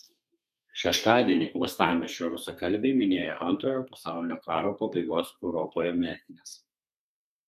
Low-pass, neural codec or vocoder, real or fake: 14.4 kHz; codec, 32 kHz, 1.9 kbps, SNAC; fake